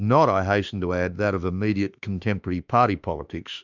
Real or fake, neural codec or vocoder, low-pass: fake; autoencoder, 48 kHz, 32 numbers a frame, DAC-VAE, trained on Japanese speech; 7.2 kHz